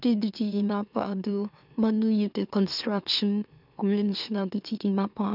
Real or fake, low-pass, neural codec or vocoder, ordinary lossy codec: fake; 5.4 kHz; autoencoder, 44.1 kHz, a latent of 192 numbers a frame, MeloTTS; none